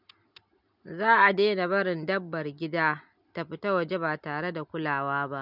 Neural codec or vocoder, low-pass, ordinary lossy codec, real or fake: none; 5.4 kHz; none; real